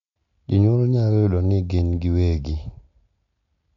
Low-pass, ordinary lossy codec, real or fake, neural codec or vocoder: 7.2 kHz; none; real; none